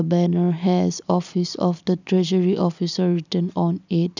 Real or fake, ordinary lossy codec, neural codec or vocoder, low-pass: real; none; none; 7.2 kHz